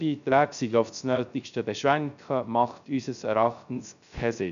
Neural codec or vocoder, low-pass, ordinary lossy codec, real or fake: codec, 16 kHz, 0.3 kbps, FocalCodec; 7.2 kHz; none; fake